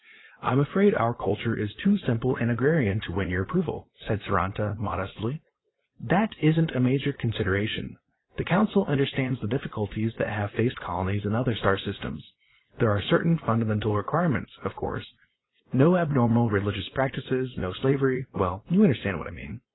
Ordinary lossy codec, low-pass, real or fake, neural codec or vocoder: AAC, 16 kbps; 7.2 kHz; fake; vocoder, 44.1 kHz, 80 mel bands, Vocos